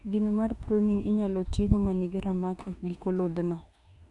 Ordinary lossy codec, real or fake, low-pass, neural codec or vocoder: none; fake; 10.8 kHz; autoencoder, 48 kHz, 32 numbers a frame, DAC-VAE, trained on Japanese speech